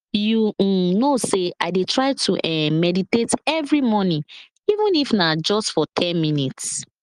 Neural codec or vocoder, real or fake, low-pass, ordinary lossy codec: none; real; 9.9 kHz; Opus, 24 kbps